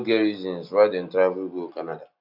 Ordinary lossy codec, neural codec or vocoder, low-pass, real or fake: AAC, 48 kbps; none; 5.4 kHz; real